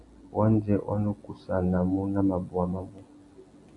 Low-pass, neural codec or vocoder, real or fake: 10.8 kHz; none; real